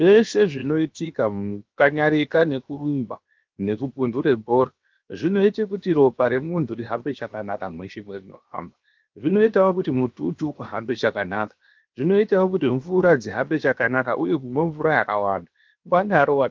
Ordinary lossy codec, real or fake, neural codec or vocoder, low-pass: Opus, 32 kbps; fake; codec, 16 kHz, 0.7 kbps, FocalCodec; 7.2 kHz